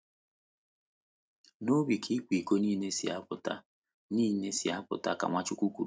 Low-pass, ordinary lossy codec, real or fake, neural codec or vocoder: none; none; real; none